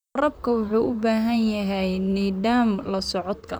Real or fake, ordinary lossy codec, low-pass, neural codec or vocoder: fake; none; none; vocoder, 44.1 kHz, 128 mel bands, Pupu-Vocoder